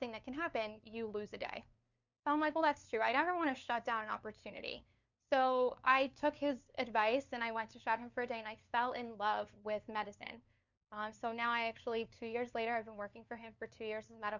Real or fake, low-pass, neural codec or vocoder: fake; 7.2 kHz; codec, 16 kHz, 2 kbps, FunCodec, trained on LibriTTS, 25 frames a second